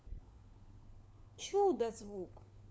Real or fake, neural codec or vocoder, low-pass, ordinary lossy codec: fake; codec, 16 kHz, 4 kbps, FunCodec, trained on LibriTTS, 50 frames a second; none; none